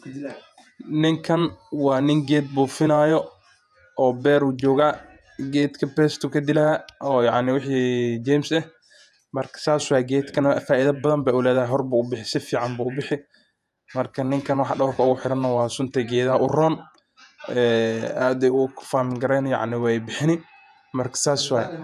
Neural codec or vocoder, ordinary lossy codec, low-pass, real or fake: vocoder, 48 kHz, 128 mel bands, Vocos; none; 14.4 kHz; fake